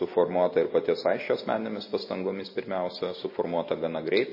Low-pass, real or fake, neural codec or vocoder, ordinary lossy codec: 5.4 kHz; real; none; MP3, 24 kbps